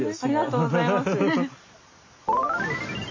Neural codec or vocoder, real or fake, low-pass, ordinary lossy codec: none; real; 7.2 kHz; MP3, 32 kbps